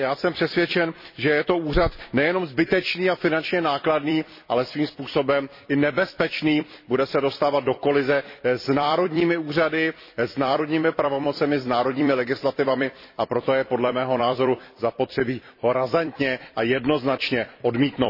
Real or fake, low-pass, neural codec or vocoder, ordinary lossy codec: fake; 5.4 kHz; vocoder, 44.1 kHz, 128 mel bands every 256 samples, BigVGAN v2; MP3, 24 kbps